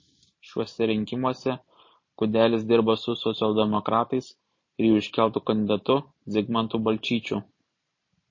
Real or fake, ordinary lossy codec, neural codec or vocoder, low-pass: fake; MP3, 32 kbps; vocoder, 44.1 kHz, 128 mel bands every 512 samples, BigVGAN v2; 7.2 kHz